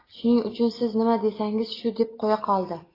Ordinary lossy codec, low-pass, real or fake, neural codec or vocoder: AAC, 24 kbps; 5.4 kHz; real; none